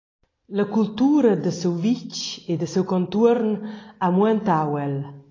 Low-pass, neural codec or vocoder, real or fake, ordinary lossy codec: 7.2 kHz; none; real; AAC, 32 kbps